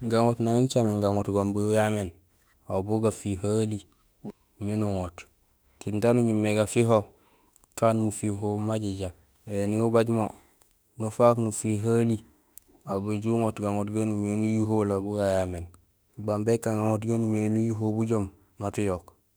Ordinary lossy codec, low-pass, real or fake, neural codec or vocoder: none; none; fake; autoencoder, 48 kHz, 32 numbers a frame, DAC-VAE, trained on Japanese speech